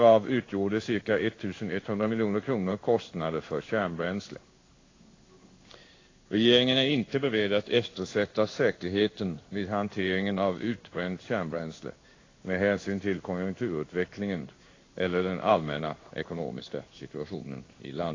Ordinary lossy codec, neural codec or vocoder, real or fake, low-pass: AAC, 32 kbps; codec, 16 kHz in and 24 kHz out, 1 kbps, XY-Tokenizer; fake; 7.2 kHz